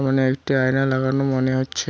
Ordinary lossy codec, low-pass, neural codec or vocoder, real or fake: none; none; none; real